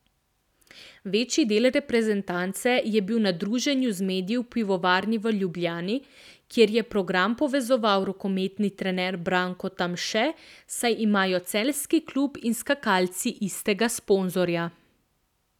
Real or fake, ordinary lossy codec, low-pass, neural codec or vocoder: real; none; 19.8 kHz; none